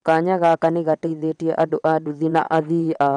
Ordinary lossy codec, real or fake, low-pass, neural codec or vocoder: none; fake; 9.9 kHz; vocoder, 22.05 kHz, 80 mel bands, WaveNeXt